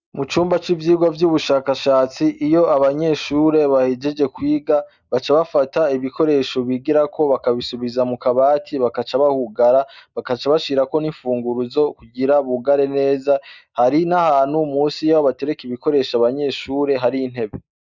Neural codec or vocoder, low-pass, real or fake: none; 7.2 kHz; real